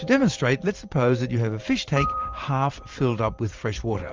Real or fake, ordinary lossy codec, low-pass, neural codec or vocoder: fake; Opus, 32 kbps; 7.2 kHz; vocoder, 44.1 kHz, 128 mel bands every 512 samples, BigVGAN v2